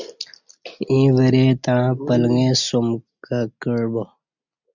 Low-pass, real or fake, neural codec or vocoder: 7.2 kHz; real; none